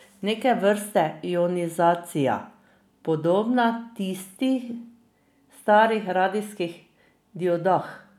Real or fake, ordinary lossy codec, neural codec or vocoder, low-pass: real; none; none; 19.8 kHz